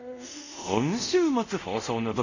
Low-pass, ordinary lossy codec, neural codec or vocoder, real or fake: 7.2 kHz; none; codec, 24 kHz, 0.5 kbps, DualCodec; fake